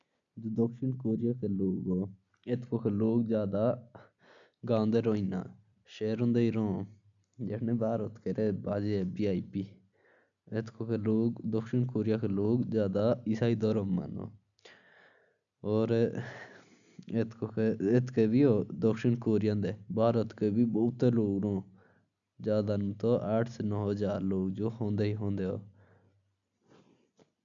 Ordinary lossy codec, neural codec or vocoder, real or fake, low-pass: none; none; real; 7.2 kHz